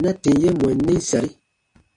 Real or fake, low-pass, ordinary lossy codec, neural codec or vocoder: real; 9.9 kHz; AAC, 48 kbps; none